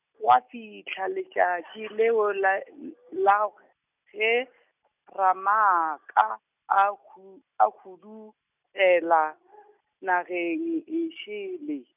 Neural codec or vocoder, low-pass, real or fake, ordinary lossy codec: none; 3.6 kHz; real; none